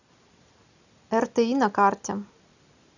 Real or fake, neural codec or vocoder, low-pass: real; none; 7.2 kHz